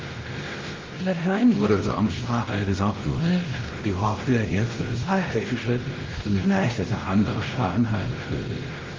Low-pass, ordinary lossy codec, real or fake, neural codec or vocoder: 7.2 kHz; Opus, 16 kbps; fake; codec, 16 kHz, 0.5 kbps, X-Codec, WavLM features, trained on Multilingual LibriSpeech